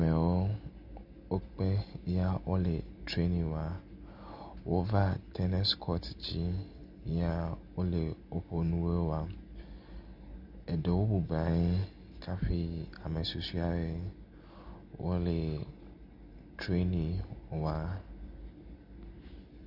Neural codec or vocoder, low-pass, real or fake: none; 5.4 kHz; real